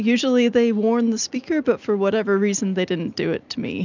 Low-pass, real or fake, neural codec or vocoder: 7.2 kHz; real; none